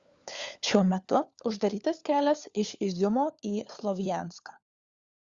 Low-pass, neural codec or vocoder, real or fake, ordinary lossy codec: 7.2 kHz; codec, 16 kHz, 4 kbps, FunCodec, trained on LibriTTS, 50 frames a second; fake; Opus, 64 kbps